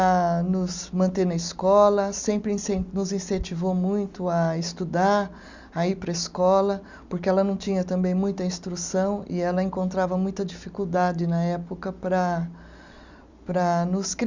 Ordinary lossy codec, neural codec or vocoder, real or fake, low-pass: Opus, 64 kbps; none; real; 7.2 kHz